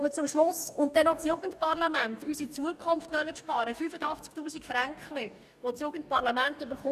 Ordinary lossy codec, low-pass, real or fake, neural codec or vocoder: none; 14.4 kHz; fake; codec, 44.1 kHz, 2.6 kbps, DAC